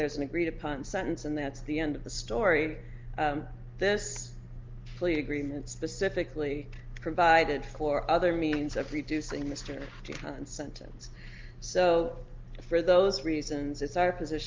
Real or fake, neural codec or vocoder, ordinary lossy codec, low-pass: real; none; Opus, 32 kbps; 7.2 kHz